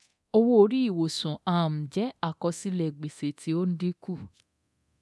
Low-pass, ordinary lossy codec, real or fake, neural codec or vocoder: none; none; fake; codec, 24 kHz, 0.9 kbps, DualCodec